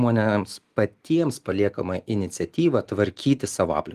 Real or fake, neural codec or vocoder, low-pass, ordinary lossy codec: real; none; 14.4 kHz; Opus, 24 kbps